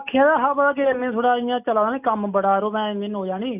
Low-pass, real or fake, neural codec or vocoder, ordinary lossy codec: 3.6 kHz; real; none; none